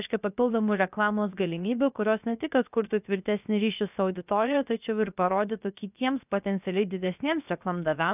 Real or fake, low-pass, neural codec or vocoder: fake; 3.6 kHz; codec, 16 kHz, 0.7 kbps, FocalCodec